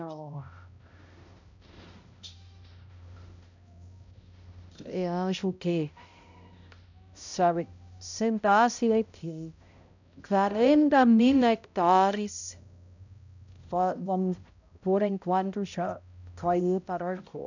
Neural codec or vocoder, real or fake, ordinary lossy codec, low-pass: codec, 16 kHz, 0.5 kbps, X-Codec, HuBERT features, trained on balanced general audio; fake; none; 7.2 kHz